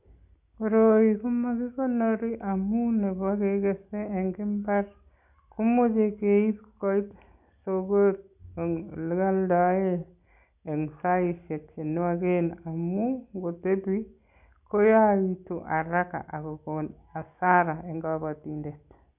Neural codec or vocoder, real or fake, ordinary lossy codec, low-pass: none; real; none; 3.6 kHz